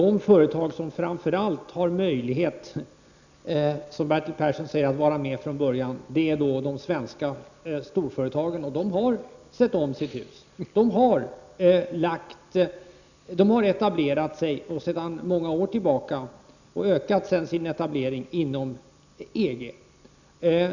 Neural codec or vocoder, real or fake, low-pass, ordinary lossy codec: none; real; 7.2 kHz; none